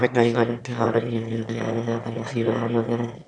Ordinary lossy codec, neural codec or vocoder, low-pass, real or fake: none; autoencoder, 22.05 kHz, a latent of 192 numbers a frame, VITS, trained on one speaker; 9.9 kHz; fake